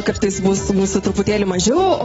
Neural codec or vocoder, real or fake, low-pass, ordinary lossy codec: none; real; 10.8 kHz; AAC, 24 kbps